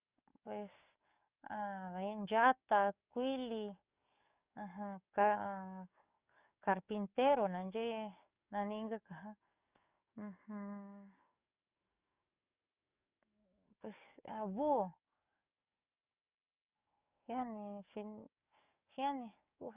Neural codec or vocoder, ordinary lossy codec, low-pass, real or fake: codec, 44.1 kHz, 7.8 kbps, DAC; Opus, 64 kbps; 3.6 kHz; fake